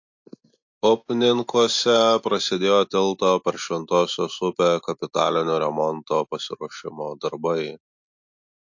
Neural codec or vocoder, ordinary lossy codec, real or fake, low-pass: none; MP3, 48 kbps; real; 7.2 kHz